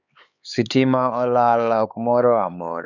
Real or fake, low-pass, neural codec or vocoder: fake; 7.2 kHz; codec, 16 kHz, 4 kbps, X-Codec, HuBERT features, trained on LibriSpeech